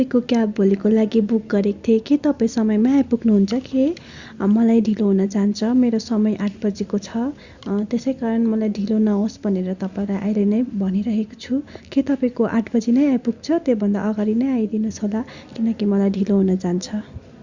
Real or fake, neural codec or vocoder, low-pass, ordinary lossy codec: real; none; 7.2 kHz; Opus, 64 kbps